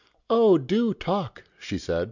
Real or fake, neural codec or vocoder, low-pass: real; none; 7.2 kHz